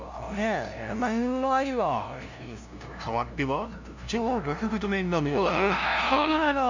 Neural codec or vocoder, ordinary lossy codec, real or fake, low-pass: codec, 16 kHz, 0.5 kbps, FunCodec, trained on LibriTTS, 25 frames a second; none; fake; 7.2 kHz